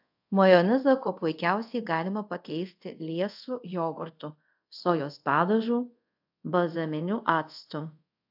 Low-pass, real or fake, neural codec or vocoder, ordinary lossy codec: 5.4 kHz; fake; codec, 24 kHz, 0.5 kbps, DualCodec; AAC, 48 kbps